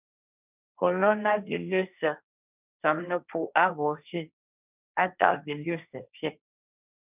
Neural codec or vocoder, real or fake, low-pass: codec, 16 kHz, 1.1 kbps, Voila-Tokenizer; fake; 3.6 kHz